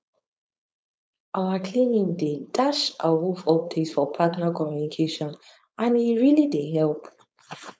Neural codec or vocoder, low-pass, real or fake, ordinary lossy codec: codec, 16 kHz, 4.8 kbps, FACodec; none; fake; none